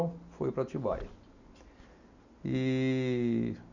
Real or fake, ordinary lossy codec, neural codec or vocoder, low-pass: real; none; none; 7.2 kHz